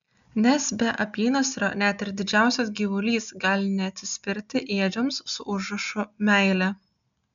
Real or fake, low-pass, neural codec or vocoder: real; 7.2 kHz; none